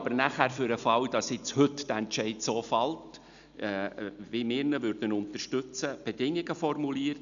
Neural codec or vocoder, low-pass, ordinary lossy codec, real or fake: none; 7.2 kHz; none; real